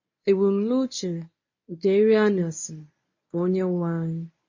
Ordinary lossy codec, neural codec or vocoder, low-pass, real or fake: MP3, 32 kbps; codec, 24 kHz, 0.9 kbps, WavTokenizer, medium speech release version 1; 7.2 kHz; fake